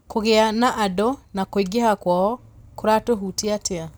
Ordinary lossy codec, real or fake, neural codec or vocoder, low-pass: none; real; none; none